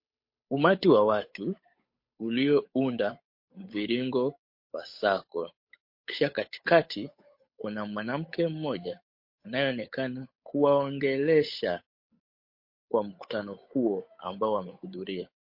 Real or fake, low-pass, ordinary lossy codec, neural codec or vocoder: fake; 5.4 kHz; MP3, 32 kbps; codec, 16 kHz, 8 kbps, FunCodec, trained on Chinese and English, 25 frames a second